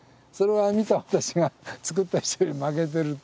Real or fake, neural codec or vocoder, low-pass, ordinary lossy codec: real; none; none; none